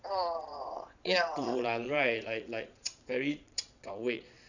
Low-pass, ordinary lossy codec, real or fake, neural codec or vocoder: 7.2 kHz; none; fake; vocoder, 22.05 kHz, 80 mel bands, Vocos